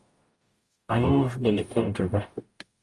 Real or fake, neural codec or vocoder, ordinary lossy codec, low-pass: fake; codec, 44.1 kHz, 0.9 kbps, DAC; Opus, 32 kbps; 10.8 kHz